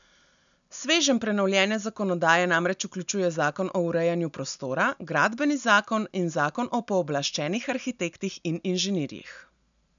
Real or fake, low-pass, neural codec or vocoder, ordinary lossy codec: real; 7.2 kHz; none; none